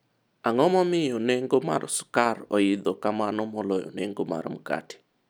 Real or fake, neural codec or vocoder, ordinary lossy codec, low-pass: real; none; none; none